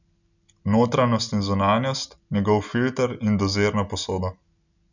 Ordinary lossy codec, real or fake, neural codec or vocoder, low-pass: none; real; none; 7.2 kHz